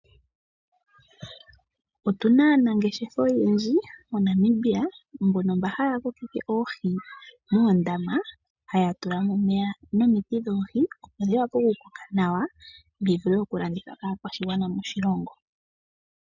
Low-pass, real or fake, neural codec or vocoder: 7.2 kHz; real; none